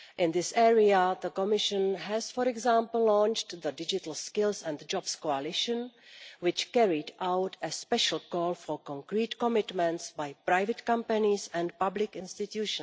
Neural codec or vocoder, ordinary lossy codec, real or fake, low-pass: none; none; real; none